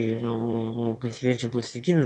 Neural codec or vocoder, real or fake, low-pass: autoencoder, 22.05 kHz, a latent of 192 numbers a frame, VITS, trained on one speaker; fake; 9.9 kHz